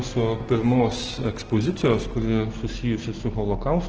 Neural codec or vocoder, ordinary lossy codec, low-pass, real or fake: none; Opus, 16 kbps; 7.2 kHz; real